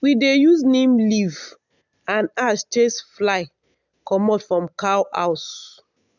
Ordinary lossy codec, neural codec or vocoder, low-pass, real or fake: none; none; 7.2 kHz; real